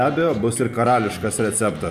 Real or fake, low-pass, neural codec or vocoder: fake; 14.4 kHz; vocoder, 44.1 kHz, 128 mel bands every 512 samples, BigVGAN v2